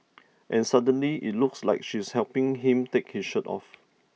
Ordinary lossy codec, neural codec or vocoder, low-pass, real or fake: none; none; none; real